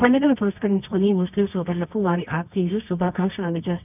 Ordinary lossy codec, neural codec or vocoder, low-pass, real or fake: none; codec, 24 kHz, 0.9 kbps, WavTokenizer, medium music audio release; 3.6 kHz; fake